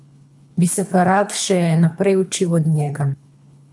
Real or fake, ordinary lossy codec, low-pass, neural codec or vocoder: fake; none; none; codec, 24 kHz, 3 kbps, HILCodec